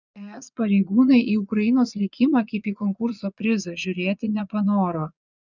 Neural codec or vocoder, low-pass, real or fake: vocoder, 22.05 kHz, 80 mel bands, Vocos; 7.2 kHz; fake